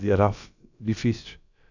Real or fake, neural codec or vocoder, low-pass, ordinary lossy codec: fake; codec, 16 kHz, about 1 kbps, DyCAST, with the encoder's durations; 7.2 kHz; none